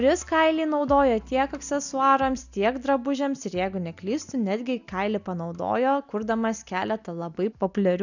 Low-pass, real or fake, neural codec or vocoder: 7.2 kHz; real; none